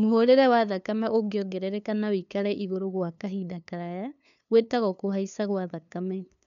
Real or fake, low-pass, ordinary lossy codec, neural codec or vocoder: fake; 7.2 kHz; none; codec, 16 kHz, 2 kbps, FunCodec, trained on LibriTTS, 25 frames a second